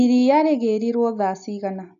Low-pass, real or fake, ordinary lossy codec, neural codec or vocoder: 7.2 kHz; real; AAC, 64 kbps; none